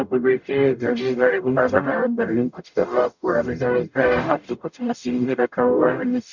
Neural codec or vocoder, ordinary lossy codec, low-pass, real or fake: codec, 44.1 kHz, 0.9 kbps, DAC; none; 7.2 kHz; fake